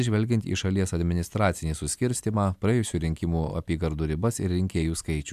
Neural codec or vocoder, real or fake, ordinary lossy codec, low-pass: none; real; AAC, 96 kbps; 14.4 kHz